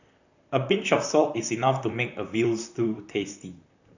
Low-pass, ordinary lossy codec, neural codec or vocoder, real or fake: 7.2 kHz; none; vocoder, 44.1 kHz, 128 mel bands, Pupu-Vocoder; fake